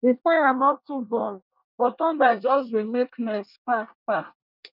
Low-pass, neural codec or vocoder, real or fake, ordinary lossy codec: 5.4 kHz; codec, 24 kHz, 1 kbps, SNAC; fake; none